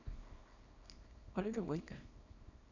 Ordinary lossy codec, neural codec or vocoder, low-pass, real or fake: none; codec, 24 kHz, 0.9 kbps, WavTokenizer, small release; 7.2 kHz; fake